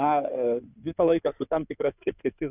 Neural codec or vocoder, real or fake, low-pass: codec, 16 kHz in and 24 kHz out, 2.2 kbps, FireRedTTS-2 codec; fake; 3.6 kHz